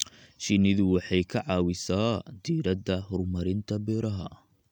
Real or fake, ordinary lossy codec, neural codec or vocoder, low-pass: real; none; none; 19.8 kHz